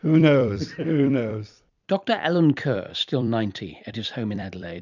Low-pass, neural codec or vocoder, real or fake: 7.2 kHz; vocoder, 44.1 kHz, 128 mel bands every 256 samples, BigVGAN v2; fake